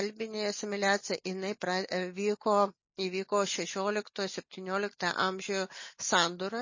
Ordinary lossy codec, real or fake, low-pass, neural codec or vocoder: MP3, 32 kbps; real; 7.2 kHz; none